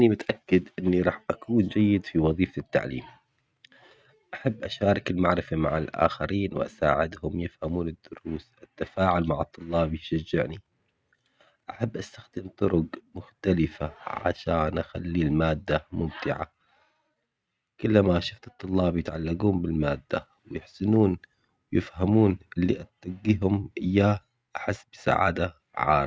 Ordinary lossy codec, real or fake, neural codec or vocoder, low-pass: none; real; none; none